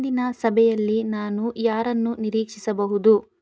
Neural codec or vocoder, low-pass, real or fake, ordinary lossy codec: none; none; real; none